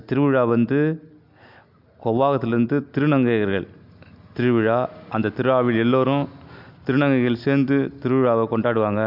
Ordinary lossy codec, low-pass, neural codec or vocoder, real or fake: AAC, 48 kbps; 5.4 kHz; none; real